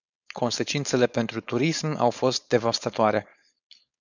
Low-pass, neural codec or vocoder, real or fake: 7.2 kHz; codec, 16 kHz, 4.8 kbps, FACodec; fake